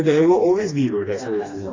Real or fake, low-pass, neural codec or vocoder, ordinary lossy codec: fake; 7.2 kHz; codec, 16 kHz, 2 kbps, FreqCodec, smaller model; AAC, 32 kbps